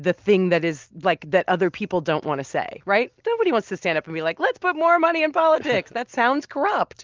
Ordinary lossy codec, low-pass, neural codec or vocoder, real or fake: Opus, 32 kbps; 7.2 kHz; none; real